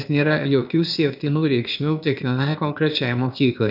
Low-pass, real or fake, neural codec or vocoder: 5.4 kHz; fake; codec, 16 kHz, 0.8 kbps, ZipCodec